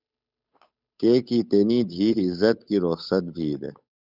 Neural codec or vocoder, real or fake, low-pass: codec, 16 kHz, 8 kbps, FunCodec, trained on Chinese and English, 25 frames a second; fake; 5.4 kHz